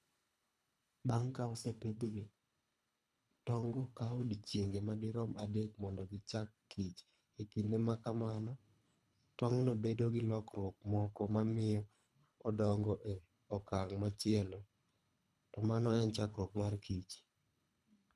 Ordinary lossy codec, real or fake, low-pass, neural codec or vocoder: none; fake; none; codec, 24 kHz, 3 kbps, HILCodec